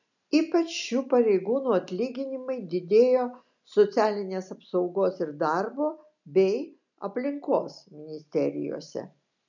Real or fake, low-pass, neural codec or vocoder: real; 7.2 kHz; none